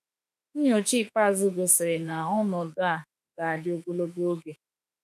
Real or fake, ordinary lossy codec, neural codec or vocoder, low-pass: fake; none; autoencoder, 48 kHz, 32 numbers a frame, DAC-VAE, trained on Japanese speech; 14.4 kHz